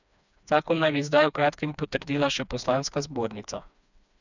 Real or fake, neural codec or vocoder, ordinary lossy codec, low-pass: fake; codec, 16 kHz, 2 kbps, FreqCodec, smaller model; none; 7.2 kHz